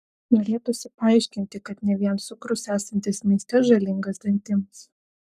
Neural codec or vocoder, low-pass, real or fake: codec, 44.1 kHz, 7.8 kbps, Pupu-Codec; 14.4 kHz; fake